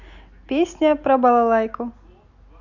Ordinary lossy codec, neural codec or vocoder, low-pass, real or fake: none; none; 7.2 kHz; real